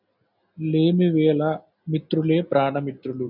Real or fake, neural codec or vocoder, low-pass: real; none; 5.4 kHz